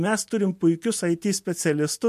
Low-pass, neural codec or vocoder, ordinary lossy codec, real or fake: 14.4 kHz; none; MP3, 64 kbps; real